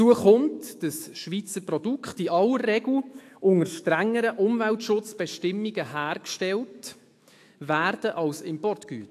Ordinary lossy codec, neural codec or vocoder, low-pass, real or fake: AAC, 64 kbps; autoencoder, 48 kHz, 128 numbers a frame, DAC-VAE, trained on Japanese speech; 14.4 kHz; fake